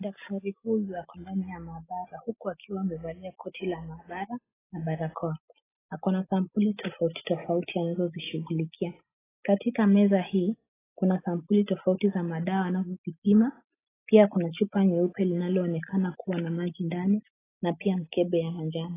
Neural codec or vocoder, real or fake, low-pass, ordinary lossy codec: none; real; 3.6 kHz; AAC, 16 kbps